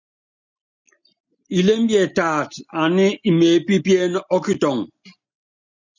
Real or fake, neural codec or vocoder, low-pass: real; none; 7.2 kHz